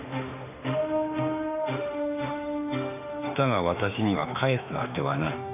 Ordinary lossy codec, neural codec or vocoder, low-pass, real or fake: none; autoencoder, 48 kHz, 32 numbers a frame, DAC-VAE, trained on Japanese speech; 3.6 kHz; fake